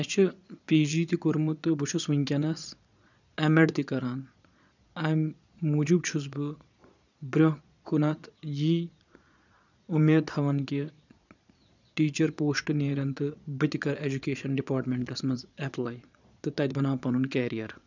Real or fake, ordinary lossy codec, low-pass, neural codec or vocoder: fake; none; 7.2 kHz; vocoder, 22.05 kHz, 80 mel bands, Vocos